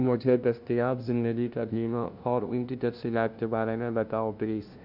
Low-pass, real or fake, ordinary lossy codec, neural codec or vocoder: 5.4 kHz; fake; none; codec, 16 kHz, 0.5 kbps, FunCodec, trained on LibriTTS, 25 frames a second